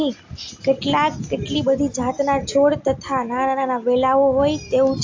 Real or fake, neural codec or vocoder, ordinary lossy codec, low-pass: real; none; AAC, 48 kbps; 7.2 kHz